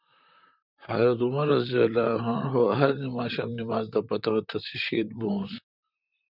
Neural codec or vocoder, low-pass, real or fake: vocoder, 44.1 kHz, 128 mel bands, Pupu-Vocoder; 5.4 kHz; fake